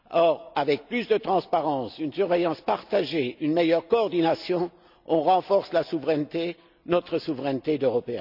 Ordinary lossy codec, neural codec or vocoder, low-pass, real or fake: none; none; 5.4 kHz; real